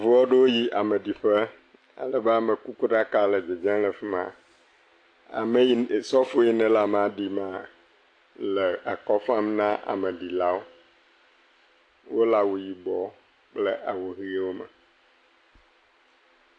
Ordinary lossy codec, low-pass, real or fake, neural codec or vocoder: AAC, 48 kbps; 9.9 kHz; real; none